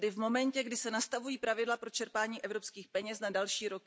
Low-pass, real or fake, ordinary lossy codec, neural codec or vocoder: none; real; none; none